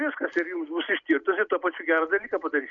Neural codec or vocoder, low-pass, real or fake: none; 7.2 kHz; real